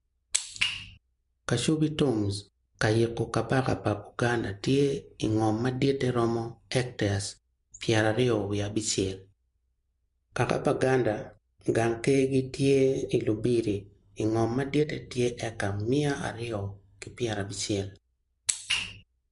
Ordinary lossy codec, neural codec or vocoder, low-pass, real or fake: MP3, 64 kbps; none; 10.8 kHz; real